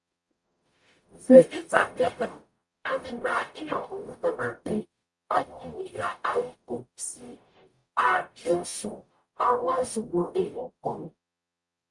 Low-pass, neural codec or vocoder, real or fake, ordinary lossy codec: 10.8 kHz; codec, 44.1 kHz, 0.9 kbps, DAC; fake; Opus, 64 kbps